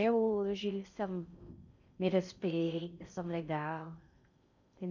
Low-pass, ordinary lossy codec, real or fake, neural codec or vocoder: 7.2 kHz; none; fake; codec, 16 kHz in and 24 kHz out, 0.6 kbps, FocalCodec, streaming, 4096 codes